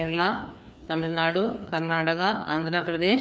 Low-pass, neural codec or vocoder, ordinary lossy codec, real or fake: none; codec, 16 kHz, 2 kbps, FreqCodec, larger model; none; fake